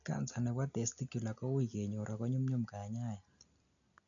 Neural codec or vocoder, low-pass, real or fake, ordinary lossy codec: none; 7.2 kHz; real; none